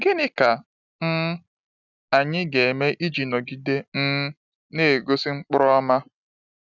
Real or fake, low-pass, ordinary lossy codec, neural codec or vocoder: real; 7.2 kHz; none; none